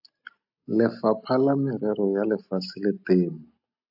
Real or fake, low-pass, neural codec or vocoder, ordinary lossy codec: real; 5.4 kHz; none; AAC, 48 kbps